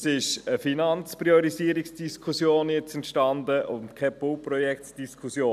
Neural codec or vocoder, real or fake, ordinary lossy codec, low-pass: none; real; none; 14.4 kHz